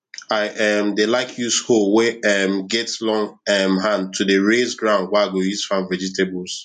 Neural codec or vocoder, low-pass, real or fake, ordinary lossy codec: none; 14.4 kHz; real; none